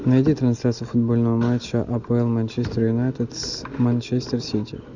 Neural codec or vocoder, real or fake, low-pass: none; real; 7.2 kHz